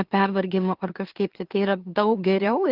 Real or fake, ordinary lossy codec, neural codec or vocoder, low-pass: fake; Opus, 16 kbps; autoencoder, 44.1 kHz, a latent of 192 numbers a frame, MeloTTS; 5.4 kHz